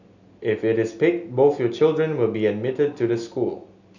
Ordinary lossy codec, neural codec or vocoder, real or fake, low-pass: none; none; real; 7.2 kHz